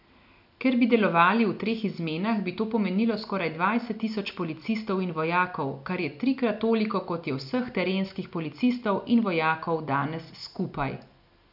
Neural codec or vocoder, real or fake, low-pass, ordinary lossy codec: none; real; 5.4 kHz; none